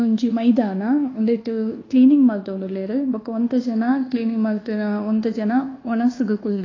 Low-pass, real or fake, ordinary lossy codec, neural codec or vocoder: 7.2 kHz; fake; AAC, 32 kbps; codec, 24 kHz, 1.2 kbps, DualCodec